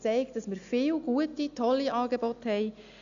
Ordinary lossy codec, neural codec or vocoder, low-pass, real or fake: none; none; 7.2 kHz; real